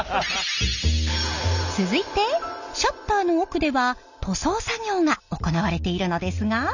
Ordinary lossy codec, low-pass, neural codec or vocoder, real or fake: none; 7.2 kHz; none; real